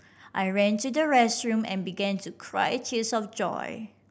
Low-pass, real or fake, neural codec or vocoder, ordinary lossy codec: none; real; none; none